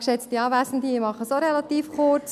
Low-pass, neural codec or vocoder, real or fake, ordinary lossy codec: 14.4 kHz; none; real; none